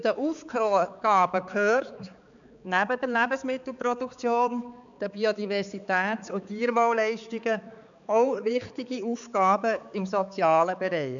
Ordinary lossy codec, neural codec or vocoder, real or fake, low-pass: none; codec, 16 kHz, 4 kbps, X-Codec, HuBERT features, trained on balanced general audio; fake; 7.2 kHz